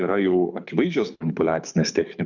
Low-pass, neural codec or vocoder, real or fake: 7.2 kHz; codec, 24 kHz, 6 kbps, HILCodec; fake